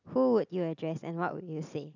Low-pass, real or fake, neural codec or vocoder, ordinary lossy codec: 7.2 kHz; real; none; none